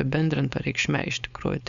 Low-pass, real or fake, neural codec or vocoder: 7.2 kHz; fake; codec, 16 kHz, 4.8 kbps, FACodec